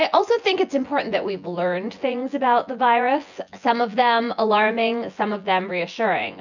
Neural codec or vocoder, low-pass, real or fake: vocoder, 24 kHz, 100 mel bands, Vocos; 7.2 kHz; fake